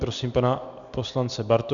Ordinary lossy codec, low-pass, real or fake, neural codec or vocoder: MP3, 96 kbps; 7.2 kHz; real; none